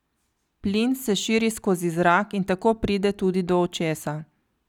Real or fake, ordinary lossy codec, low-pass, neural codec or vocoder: real; none; 19.8 kHz; none